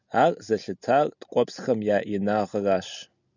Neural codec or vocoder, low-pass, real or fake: none; 7.2 kHz; real